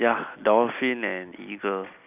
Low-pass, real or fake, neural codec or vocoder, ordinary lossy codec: 3.6 kHz; real; none; none